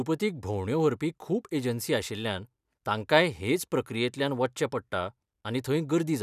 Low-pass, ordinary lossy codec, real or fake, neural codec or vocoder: 14.4 kHz; none; real; none